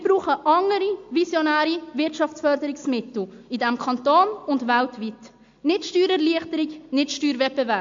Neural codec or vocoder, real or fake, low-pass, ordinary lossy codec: none; real; 7.2 kHz; MP3, 48 kbps